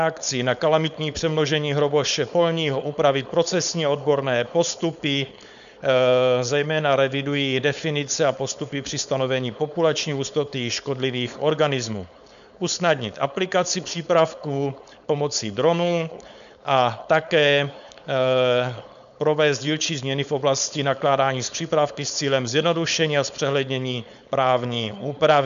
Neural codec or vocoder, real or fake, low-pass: codec, 16 kHz, 4.8 kbps, FACodec; fake; 7.2 kHz